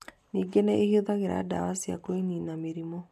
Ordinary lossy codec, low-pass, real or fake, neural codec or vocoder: none; 14.4 kHz; real; none